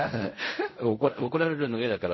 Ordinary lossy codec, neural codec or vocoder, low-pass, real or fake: MP3, 24 kbps; codec, 16 kHz in and 24 kHz out, 0.4 kbps, LongCat-Audio-Codec, fine tuned four codebook decoder; 7.2 kHz; fake